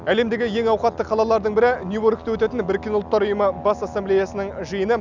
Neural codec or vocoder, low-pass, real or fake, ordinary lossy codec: none; 7.2 kHz; real; none